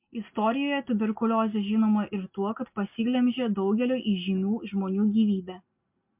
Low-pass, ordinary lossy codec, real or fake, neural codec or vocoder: 3.6 kHz; MP3, 32 kbps; real; none